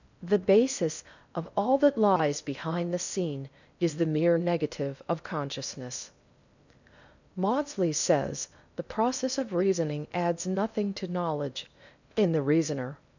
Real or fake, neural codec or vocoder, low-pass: fake; codec, 16 kHz in and 24 kHz out, 0.6 kbps, FocalCodec, streaming, 4096 codes; 7.2 kHz